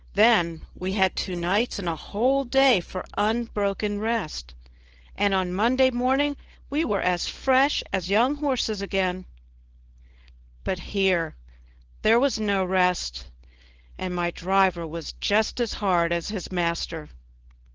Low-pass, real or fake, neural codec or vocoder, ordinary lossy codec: 7.2 kHz; fake; codec, 16 kHz, 4.8 kbps, FACodec; Opus, 16 kbps